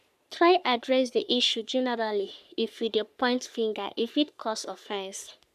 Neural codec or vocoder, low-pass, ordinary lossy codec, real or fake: codec, 44.1 kHz, 3.4 kbps, Pupu-Codec; 14.4 kHz; none; fake